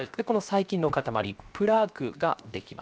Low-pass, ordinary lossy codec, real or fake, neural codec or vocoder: none; none; fake; codec, 16 kHz, 0.7 kbps, FocalCodec